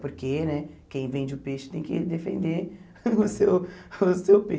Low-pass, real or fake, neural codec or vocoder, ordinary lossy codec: none; real; none; none